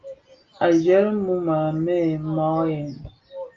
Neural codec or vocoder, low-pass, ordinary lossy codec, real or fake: none; 7.2 kHz; Opus, 32 kbps; real